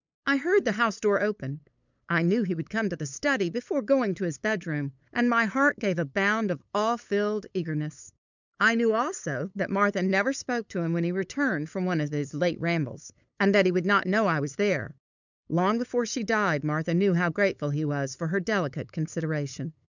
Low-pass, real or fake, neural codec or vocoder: 7.2 kHz; fake; codec, 16 kHz, 8 kbps, FunCodec, trained on LibriTTS, 25 frames a second